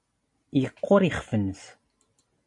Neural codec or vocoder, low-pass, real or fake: none; 10.8 kHz; real